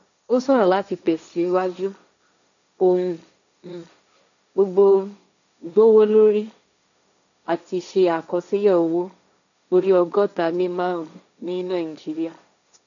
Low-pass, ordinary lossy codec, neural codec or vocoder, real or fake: 7.2 kHz; none; codec, 16 kHz, 1.1 kbps, Voila-Tokenizer; fake